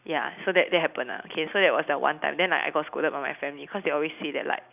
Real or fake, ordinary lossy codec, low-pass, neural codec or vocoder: real; none; 3.6 kHz; none